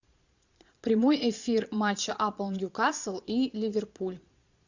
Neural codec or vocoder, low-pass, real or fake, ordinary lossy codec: vocoder, 22.05 kHz, 80 mel bands, Vocos; 7.2 kHz; fake; Opus, 64 kbps